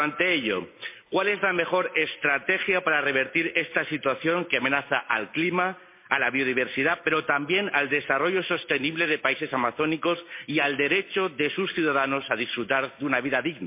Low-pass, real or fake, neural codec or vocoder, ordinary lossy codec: 3.6 kHz; real; none; MP3, 24 kbps